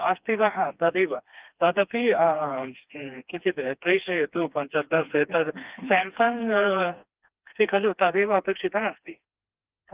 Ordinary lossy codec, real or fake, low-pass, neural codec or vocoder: Opus, 32 kbps; fake; 3.6 kHz; codec, 16 kHz, 2 kbps, FreqCodec, smaller model